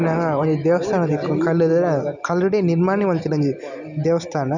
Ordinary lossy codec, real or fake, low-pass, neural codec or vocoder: none; real; 7.2 kHz; none